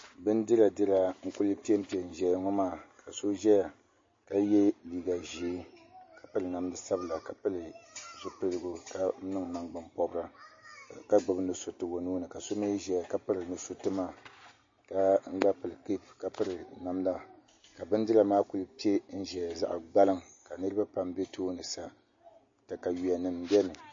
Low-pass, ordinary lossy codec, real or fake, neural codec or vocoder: 7.2 kHz; MP3, 32 kbps; real; none